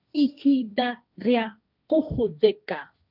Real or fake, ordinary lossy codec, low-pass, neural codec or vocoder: fake; none; 5.4 kHz; codec, 16 kHz, 1.1 kbps, Voila-Tokenizer